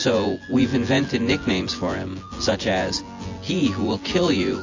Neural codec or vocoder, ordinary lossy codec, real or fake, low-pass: vocoder, 24 kHz, 100 mel bands, Vocos; AAC, 48 kbps; fake; 7.2 kHz